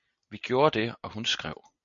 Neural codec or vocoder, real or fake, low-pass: none; real; 7.2 kHz